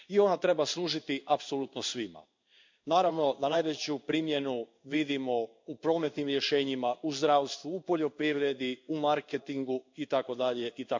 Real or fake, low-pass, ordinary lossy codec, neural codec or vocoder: fake; 7.2 kHz; MP3, 64 kbps; codec, 16 kHz in and 24 kHz out, 1 kbps, XY-Tokenizer